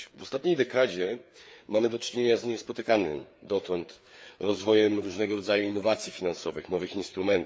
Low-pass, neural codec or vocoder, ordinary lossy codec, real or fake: none; codec, 16 kHz, 4 kbps, FreqCodec, larger model; none; fake